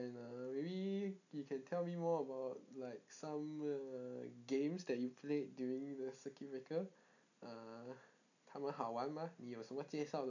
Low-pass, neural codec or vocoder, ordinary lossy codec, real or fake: 7.2 kHz; none; none; real